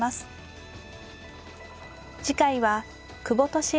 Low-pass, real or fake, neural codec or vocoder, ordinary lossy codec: none; real; none; none